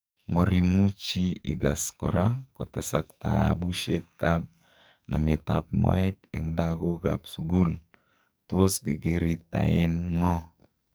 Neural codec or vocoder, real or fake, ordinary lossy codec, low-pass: codec, 44.1 kHz, 2.6 kbps, SNAC; fake; none; none